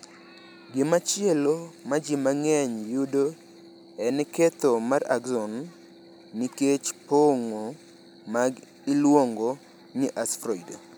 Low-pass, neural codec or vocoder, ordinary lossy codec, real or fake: none; none; none; real